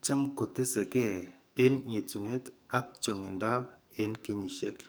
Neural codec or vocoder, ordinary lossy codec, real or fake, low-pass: codec, 44.1 kHz, 2.6 kbps, SNAC; none; fake; none